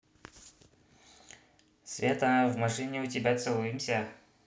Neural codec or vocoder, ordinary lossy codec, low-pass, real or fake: none; none; none; real